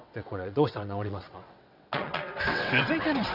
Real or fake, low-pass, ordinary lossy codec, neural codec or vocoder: fake; 5.4 kHz; none; vocoder, 22.05 kHz, 80 mel bands, WaveNeXt